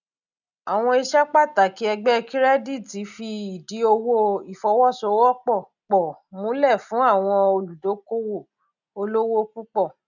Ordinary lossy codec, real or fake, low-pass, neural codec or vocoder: none; real; 7.2 kHz; none